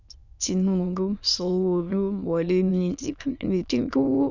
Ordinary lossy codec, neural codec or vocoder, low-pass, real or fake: none; autoencoder, 22.05 kHz, a latent of 192 numbers a frame, VITS, trained on many speakers; 7.2 kHz; fake